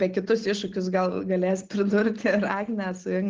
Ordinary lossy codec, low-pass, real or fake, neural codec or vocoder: Opus, 16 kbps; 7.2 kHz; real; none